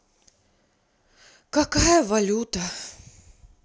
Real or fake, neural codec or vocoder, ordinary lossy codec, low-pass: real; none; none; none